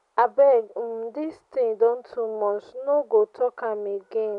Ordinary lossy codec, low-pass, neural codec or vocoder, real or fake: none; 10.8 kHz; none; real